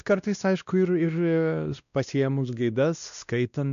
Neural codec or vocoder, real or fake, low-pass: codec, 16 kHz, 1 kbps, X-Codec, WavLM features, trained on Multilingual LibriSpeech; fake; 7.2 kHz